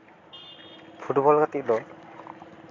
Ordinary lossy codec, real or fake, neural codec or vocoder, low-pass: AAC, 32 kbps; real; none; 7.2 kHz